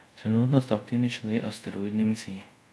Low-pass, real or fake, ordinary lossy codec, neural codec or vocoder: none; fake; none; codec, 24 kHz, 0.5 kbps, DualCodec